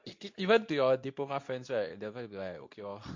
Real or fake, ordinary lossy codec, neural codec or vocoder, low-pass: fake; MP3, 48 kbps; codec, 24 kHz, 0.9 kbps, WavTokenizer, medium speech release version 2; 7.2 kHz